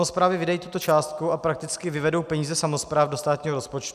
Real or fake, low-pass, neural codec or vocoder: real; 14.4 kHz; none